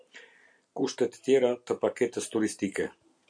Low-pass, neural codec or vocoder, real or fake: 9.9 kHz; none; real